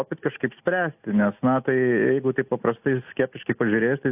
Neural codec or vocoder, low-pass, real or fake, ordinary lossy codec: none; 3.6 kHz; real; AAC, 32 kbps